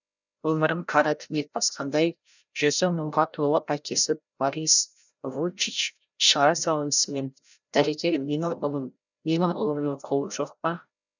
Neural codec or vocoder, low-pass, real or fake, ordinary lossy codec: codec, 16 kHz, 0.5 kbps, FreqCodec, larger model; 7.2 kHz; fake; none